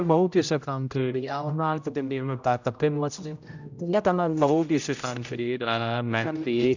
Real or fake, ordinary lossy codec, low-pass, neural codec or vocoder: fake; none; 7.2 kHz; codec, 16 kHz, 0.5 kbps, X-Codec, HuBERT features, trained on general audio